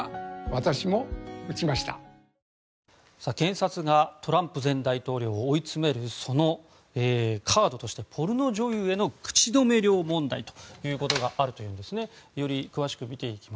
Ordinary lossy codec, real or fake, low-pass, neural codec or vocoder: none; real; none; none